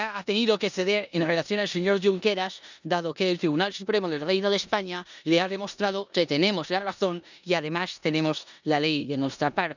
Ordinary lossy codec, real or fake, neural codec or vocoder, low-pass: none; fake; codec, 16 kHz in and 24 kHz out, 0.9 kbps, LongCat-Audio-Codec, four codebook decoder; 7.2 kHz